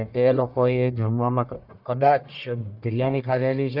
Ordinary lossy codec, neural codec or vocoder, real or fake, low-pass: none; codec, 44.1 kHz, 1.7 kbps, Pupu-Codec; fake; 5.4 kHz